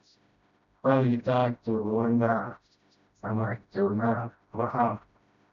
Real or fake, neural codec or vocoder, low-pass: fake; codec, 16 kHz, 0.5 kbps, FreqCodec, smaller model; 7.2 kHz